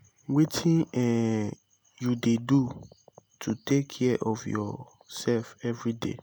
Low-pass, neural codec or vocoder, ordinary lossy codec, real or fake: none; none; none; real